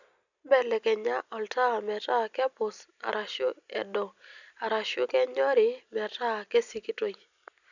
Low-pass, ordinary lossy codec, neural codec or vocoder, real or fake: 7.2 kHz; none; none; real